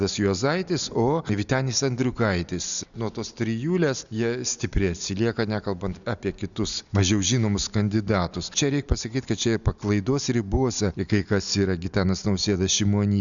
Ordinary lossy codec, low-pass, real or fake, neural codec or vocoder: MP3, 96 kbps; 7.2 kHz; real; none